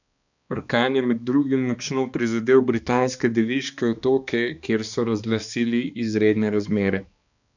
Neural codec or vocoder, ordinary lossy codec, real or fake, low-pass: codec, 16 kHz, 2 kbps, X-Codec, HuBERT features, trained on balanced general audio; none; fake; 7.2 kHz